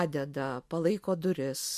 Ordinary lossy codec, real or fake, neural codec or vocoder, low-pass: MP3, 64 kbps; real; none; 14.4 kHz